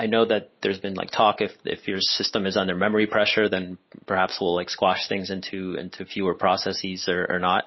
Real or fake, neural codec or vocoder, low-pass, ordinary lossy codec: real; none; 7.2 kHz; MP3, 24 kbps